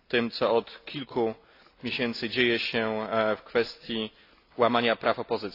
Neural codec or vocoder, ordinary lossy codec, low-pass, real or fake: none; AAC, 32 kbps; 5.4 kHz; real